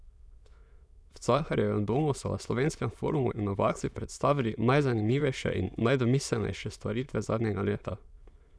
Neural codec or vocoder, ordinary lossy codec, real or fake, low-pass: autoencoder, 22.05 kHz, a latent of 192 numbers a frame, VITS, trained on many speakers; none; fake; none